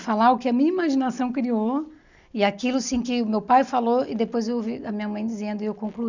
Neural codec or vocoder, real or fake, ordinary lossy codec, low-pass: none; real; none; 7.2 kHz